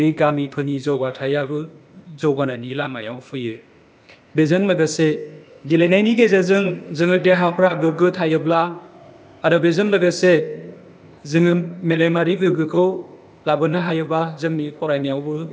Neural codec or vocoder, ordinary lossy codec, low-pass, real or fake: codec, 16 kHz, 0.8 kbps, ZipCodec; none; none; fake